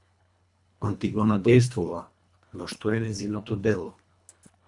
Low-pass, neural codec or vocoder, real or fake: 10.8 kHz; codec, 24 kHz, 1.5 kbps, HILCodec; fake